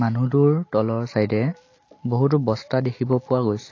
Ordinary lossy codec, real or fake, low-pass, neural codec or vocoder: AAC, 32 kbps; real; 7.2 kHz; none